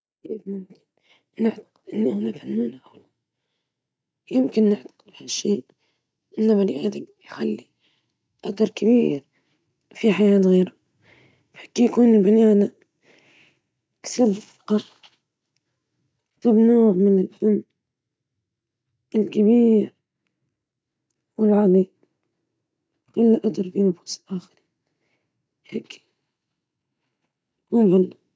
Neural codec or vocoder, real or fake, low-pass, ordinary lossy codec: none; real; none; none